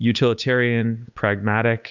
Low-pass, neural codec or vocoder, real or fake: 7.2 kHz; codec, 16 kHz, 8 kbps, FunCodec, trained on Chinese and English, 25 frames a second; fake